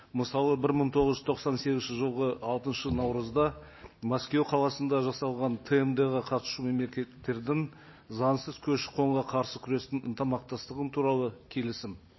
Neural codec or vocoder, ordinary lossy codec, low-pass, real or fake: none; MP3, 24 kbps; 7.2 kHz; real